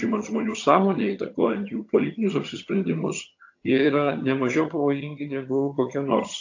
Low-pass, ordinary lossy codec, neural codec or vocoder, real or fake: 7.2 kHz; AAC, 48 kbps; vocoder, 22.05 kHz, 80 mel bands, HiFi-GAN; fake